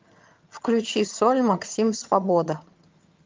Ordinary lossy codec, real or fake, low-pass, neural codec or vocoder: Opus, 24 kbps; fake; 7.2 kHz; vocoder, 22.05 kHz, 80 mel bands, HiFi-GAN